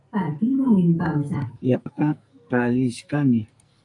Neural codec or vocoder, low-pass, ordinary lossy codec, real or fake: codec, 44.1 kHz, 2.6 kbps, SNAC; 10.8 kHz; AAC, 64 kbps; fake